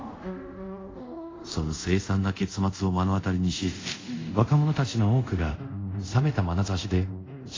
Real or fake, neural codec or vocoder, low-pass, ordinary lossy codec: fake; codec, 24 kHz, 0.5 kbps, DualCodec; 7.2 kHz; AAC, 32 kbps